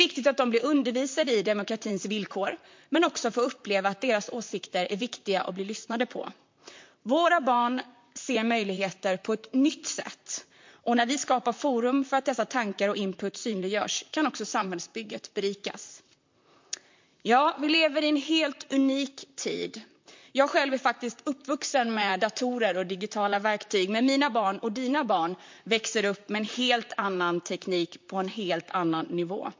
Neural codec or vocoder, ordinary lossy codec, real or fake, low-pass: vocoder, 44.1 kHz, 128 mel bands, Pupu-Vocoder; MP3, 48 kbps; fake; 7.2 kHz